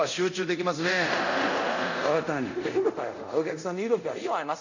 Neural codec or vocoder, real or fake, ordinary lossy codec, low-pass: codec, 24 kHz, 0.5 kbps, DualCodec; fake; none; 7.2 kHz